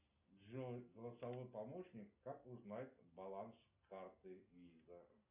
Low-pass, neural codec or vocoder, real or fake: 3.6 kHz; none; real